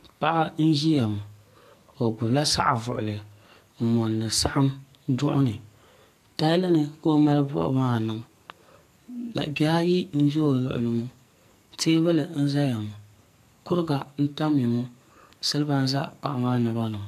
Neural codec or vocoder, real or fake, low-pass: codec, 44.1 kHz, 2.6 kbps, SNAC; fake; 14.4 kHz